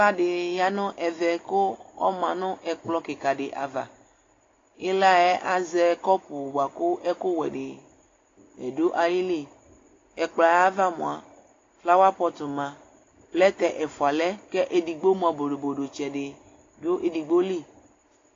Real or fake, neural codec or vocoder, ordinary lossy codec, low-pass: real; none; AAC, 32 kbps; 7.2 kHz